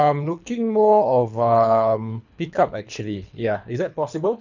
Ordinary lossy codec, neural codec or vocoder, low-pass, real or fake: none; codec, 24 kHz, 3 kbps, HILCodec; 7.2 kHz; fake